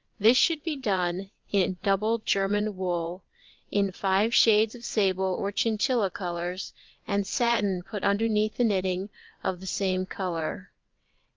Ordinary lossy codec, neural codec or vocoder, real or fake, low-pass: Opus, 24 kbps; vocoder, 22.05 kHz, 80 mel bands, WaveNeXt; fake; 7.2 kHz